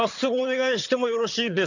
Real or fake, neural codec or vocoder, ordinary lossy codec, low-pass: fake; vocoder, 22.05 kHz, 80 mel bands, HiFi-GAN; none; 7.2 kHz